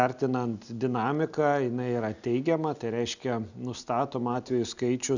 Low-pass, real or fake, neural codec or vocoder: 7.2 kHz; real; none